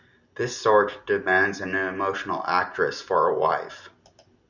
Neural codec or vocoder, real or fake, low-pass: none; real; 7.2 kHz